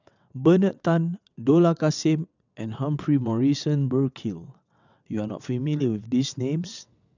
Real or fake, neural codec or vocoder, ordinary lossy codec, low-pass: fake; vocoder, 22.05 kHz, 80 mel bands, WaveNeXt; none; 7.2 kHz